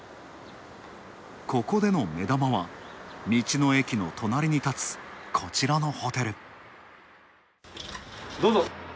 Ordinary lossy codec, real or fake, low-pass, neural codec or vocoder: none; real; none; none